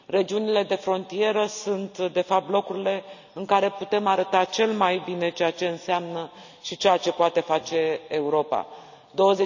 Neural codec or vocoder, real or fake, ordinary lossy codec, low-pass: none; real; none; 7.2 kHz